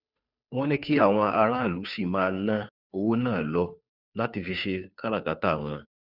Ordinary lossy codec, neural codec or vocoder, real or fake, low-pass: none; codec, 16 kHz, 2 kbps, FunCodec, trained on Chinese and English, 25 frames a second; fake; 5.4 kHz